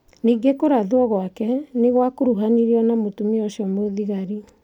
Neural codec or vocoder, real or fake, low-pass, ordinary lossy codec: none; real; 19.8 kHz; none